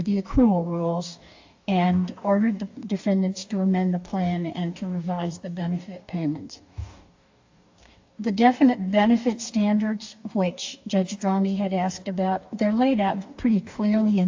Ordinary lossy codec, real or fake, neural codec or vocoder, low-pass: AAC, 48 kbps; fake; codec, 44.1 kHz, 2.6 kbps, DAC; 7.2 kHz